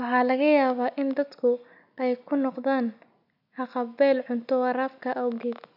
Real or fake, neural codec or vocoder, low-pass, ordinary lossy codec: real; none; 5.4 kHz; none